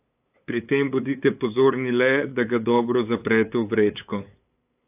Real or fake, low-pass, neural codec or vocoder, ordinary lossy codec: fake; 3.6 kHz; codec, 16 kHz, 8 kbps, FunCodec, trained on LibriTTS, 25 frames a second; AAC, 32 kbps